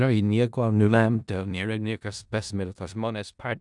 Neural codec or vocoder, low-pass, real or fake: codec, 16 kHz in and 24 kHz out, 0.4 kbps, LongCat-Audio-Codec, four codebook decoder; 10.8 kHz; fake